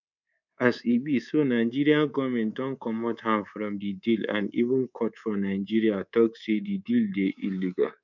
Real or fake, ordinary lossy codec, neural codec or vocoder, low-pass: fake; none; codec, 24 kHz, 3.1 kbps, DualCodec; 7.2 kHz